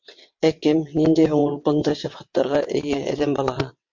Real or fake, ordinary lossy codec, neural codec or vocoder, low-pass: fake; MP3, 48 kbps; vocoder, 22.05 kHz, 80 mel bands, WaveNeXt; 7.2 kHz